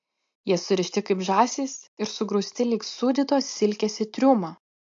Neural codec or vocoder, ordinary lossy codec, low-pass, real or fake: none; MP3, 48 kbps; 7.2 kHz; real